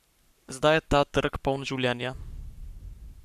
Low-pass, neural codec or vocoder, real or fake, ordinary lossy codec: 14.4 kHz; codec, 44.1 kHz, 7.8 kbps, Pupu-Codec; fake; none